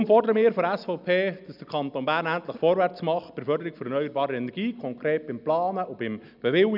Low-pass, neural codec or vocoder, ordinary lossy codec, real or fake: 5.4 kHz; none; none; real